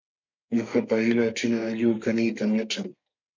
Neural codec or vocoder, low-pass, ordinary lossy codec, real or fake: codec, 32 kHz, 1.9 kbps, SNAC; 7.2 kHz; MP3, 64 kbps; fake